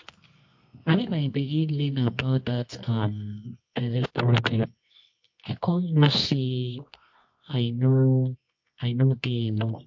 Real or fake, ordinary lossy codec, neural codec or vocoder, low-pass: fake; MP3, 48 kbps; codec, 24 kHz, 0.9 kbps, WavTokenizer, medium music audio release; 7.2 kHz